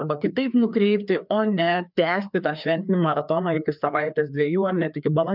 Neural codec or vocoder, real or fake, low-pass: codec, 16 kHz, 2 kbps, FreqCodec, larger model; fake; 5.4 kHz